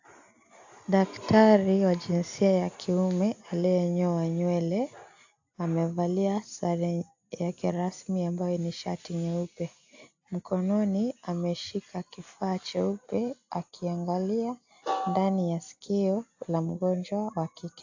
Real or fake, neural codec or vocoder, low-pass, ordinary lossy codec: real; none; 7.2 kHz; AAC, 48 kbps